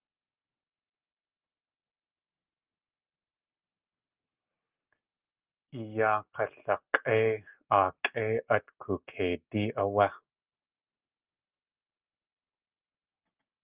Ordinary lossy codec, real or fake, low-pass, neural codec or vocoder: Opus, 24 kbps; real; 3.6 kHz; none